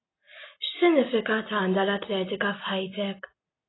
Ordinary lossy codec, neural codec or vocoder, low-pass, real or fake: AAC, 16 kbps; none; 7.2 kHz; real